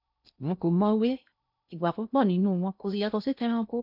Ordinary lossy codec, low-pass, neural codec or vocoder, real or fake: none; 5.4 kHz; codec, 16 kHz in and 24 kHz out, 0.6 kbps, FocalCodec, streaming, 2048 codes; fake